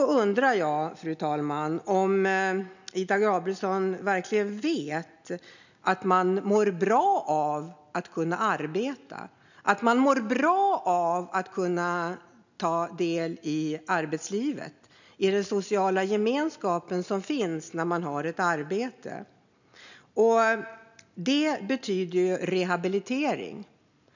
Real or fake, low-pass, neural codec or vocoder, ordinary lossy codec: real; 7.2 kHz; none; none